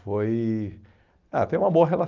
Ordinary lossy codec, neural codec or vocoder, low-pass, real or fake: Opus, 24 kbps; none; 7.2 kHz; real